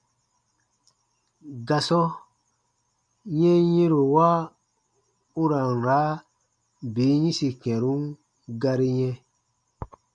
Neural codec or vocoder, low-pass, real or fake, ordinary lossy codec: none; 9.9 kHz; real; MP3, 96 kbps